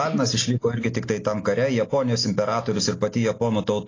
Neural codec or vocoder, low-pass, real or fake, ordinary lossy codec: none; 7.2 kHz; real; AAC, 32 kbps